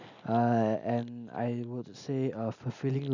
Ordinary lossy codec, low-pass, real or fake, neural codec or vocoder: none; 7.2 kHz; real; none